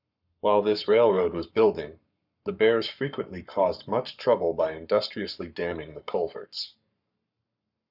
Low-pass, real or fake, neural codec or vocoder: 5.4 kHz; fake; codec, 44.1 kHz, 7.8 kbps, Pupu-Codec